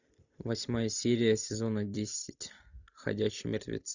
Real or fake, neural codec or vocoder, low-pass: real; none; 7.2 kHz